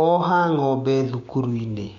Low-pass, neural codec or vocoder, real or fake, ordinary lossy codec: 7.2 kHz; codec, 16 kHz, 6 kbps, DAC; fake; MP3, 64 kbps